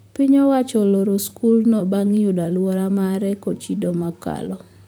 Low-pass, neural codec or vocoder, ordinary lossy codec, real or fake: none; vocoder, 44.1 kHz, 128 mel bands, Pupu-Vocoder; none; fake